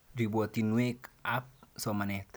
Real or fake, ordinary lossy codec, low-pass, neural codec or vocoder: real; none; none; none